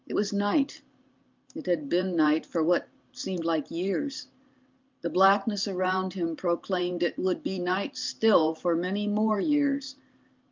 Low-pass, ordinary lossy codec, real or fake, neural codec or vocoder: 7.2 kHz; Opus, 24 kbps; fake; vocoder, 44.1 kHz, 128 mel bands every 512 samples, BigVGAN v2